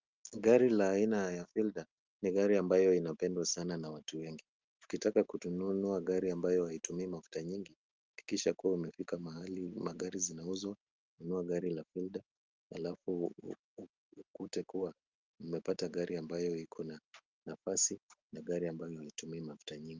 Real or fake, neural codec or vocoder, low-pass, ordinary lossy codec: real; none; 7.2 kHz; Opus, 24 kbps